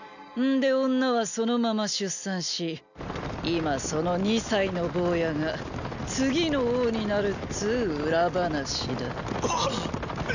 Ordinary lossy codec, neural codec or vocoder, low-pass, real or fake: none; none; 7.2 kHz; real